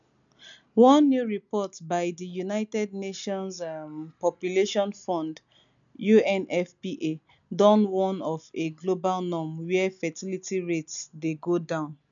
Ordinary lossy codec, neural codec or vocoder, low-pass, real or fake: none; none; 7.2 kHz; real